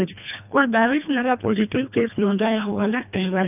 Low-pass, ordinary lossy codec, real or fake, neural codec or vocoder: 3.6 kHz; none; fake; codec, 24 kHz, 1.5 kbps, HILCodec